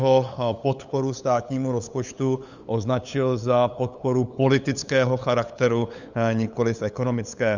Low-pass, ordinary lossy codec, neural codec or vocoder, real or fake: 7.2 kHz; Opus, 64 kbps; codec, 16 kHz, 8 kbps, FunCodec, trained on LibriTTS, 25 frames a second; fake